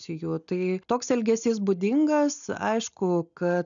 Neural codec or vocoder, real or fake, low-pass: none; real; 7.2 kHz